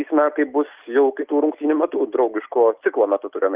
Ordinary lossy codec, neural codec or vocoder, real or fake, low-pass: Opus, 32 kbps; none; real; 3.6 kHz